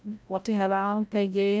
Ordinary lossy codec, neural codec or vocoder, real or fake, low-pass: none; codec, 16 kHz, 0.5 kbps, FreqCodec, larger model; fake; none